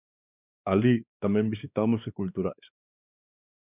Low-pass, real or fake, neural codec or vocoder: 3.6 kHz; fake; codec, 16 kHz, 2 kbps, X-Codec, WavLM features, trained on Multilingual LibriSpeech